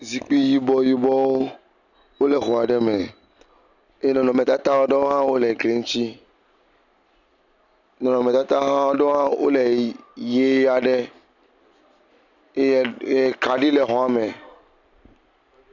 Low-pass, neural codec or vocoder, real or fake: 7.2 kHz; none; real